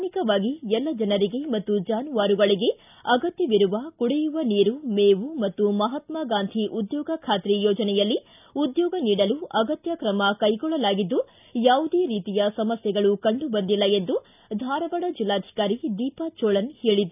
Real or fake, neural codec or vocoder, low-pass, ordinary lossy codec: real; none; 3.6 kHz; none